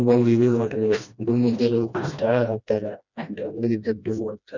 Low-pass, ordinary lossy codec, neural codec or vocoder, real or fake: 7.2 kHz; none; codec, 16 kHz, 1 kbps, FreqCodec, smaller model; fake